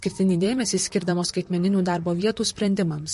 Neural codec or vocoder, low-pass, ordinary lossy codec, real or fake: vocoder, 44.1 kHz, 128 mel bands, Pupu-Vocoder; 14.4 kHz; MP3, 48 kbps; fake